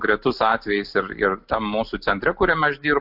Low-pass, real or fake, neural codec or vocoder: 5.4 kHz; real; none